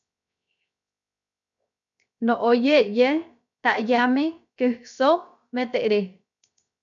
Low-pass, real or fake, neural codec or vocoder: 7.2 kHz; fake; codec, 16 kHz, 0.7 kbps, FocalCodec